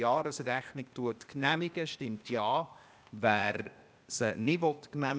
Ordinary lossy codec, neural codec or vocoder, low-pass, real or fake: none; codec, 16 kHz, 0.8 kbps, ZipCodec; none; fake